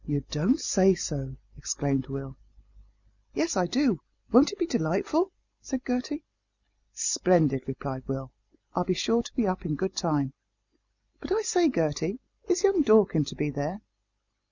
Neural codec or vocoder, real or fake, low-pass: none; real; 7.2 kHz